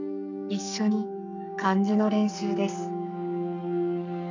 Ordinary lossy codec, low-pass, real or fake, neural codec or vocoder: none; 7.2 kHz; fake; codec, 44.1 kHz, 2.6 kbps, SNAC